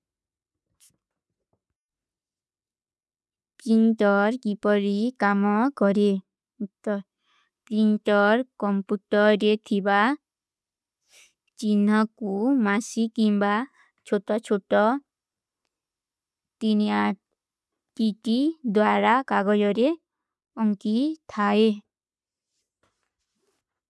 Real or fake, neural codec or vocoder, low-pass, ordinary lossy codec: real; none; none; none